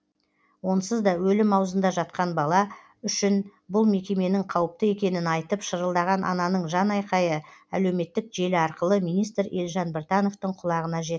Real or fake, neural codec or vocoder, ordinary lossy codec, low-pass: real; none; none; none